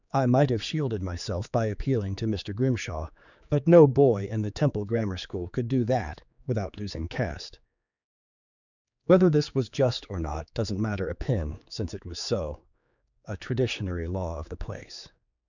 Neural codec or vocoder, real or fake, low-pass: codec, 16 kHz, 4 kbps, X-Codec, HuBERT features, trained on general audio; fake; 7.2 kHz